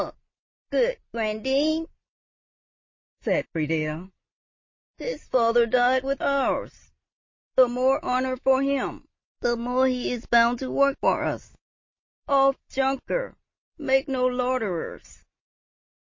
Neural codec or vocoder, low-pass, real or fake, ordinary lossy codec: none; 7.2 kHz; real; MP3, 32 kbps